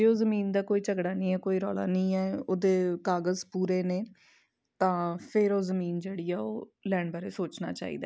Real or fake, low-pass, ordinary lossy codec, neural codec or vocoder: real; none; none; none